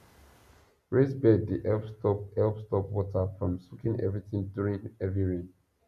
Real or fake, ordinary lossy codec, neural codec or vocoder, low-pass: real; none; none; 14.4 kHz